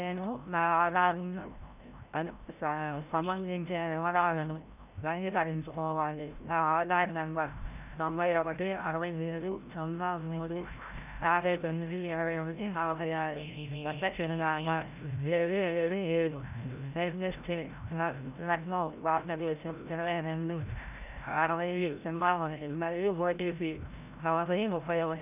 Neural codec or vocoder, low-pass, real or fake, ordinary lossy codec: codec, 16 kHz, 0.5 kbps, FreqCodec, larger model; 3.6 kHz; fake; none